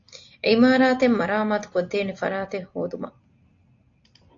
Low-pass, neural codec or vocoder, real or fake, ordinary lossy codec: 7.2 kHz; none; real; AAC, 48 kbps